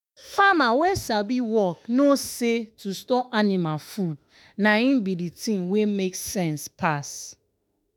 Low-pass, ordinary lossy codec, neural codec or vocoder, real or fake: none; none; autoencoder, 48 kHz, 32 numbers a frame, DAC-VAE, trained on Japanese speech; fake